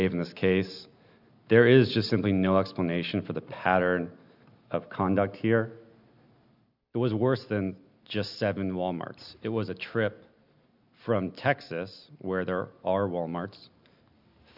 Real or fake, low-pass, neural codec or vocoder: real; 5.4 kHz; none